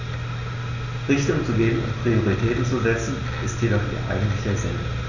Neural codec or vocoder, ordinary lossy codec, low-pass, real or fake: none; none; 7.2 kHz; real